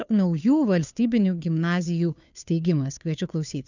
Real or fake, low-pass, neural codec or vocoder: fake; 7.2 kHz; codec, 16 kHz, 2 kbps, FunCodec, trained on Chinese and English, 25 frames a second